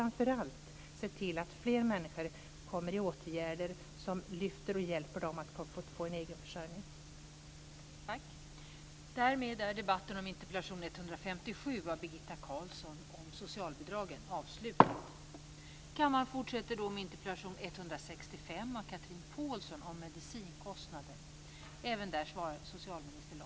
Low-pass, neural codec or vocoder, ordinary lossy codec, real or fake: none; none; none; real